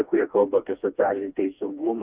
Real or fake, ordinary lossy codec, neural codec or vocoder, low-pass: fake; Opus, 64 kbps; codec, 16 kHz, 2 kbps, FreqCodec, smaller model; 3.6 kHz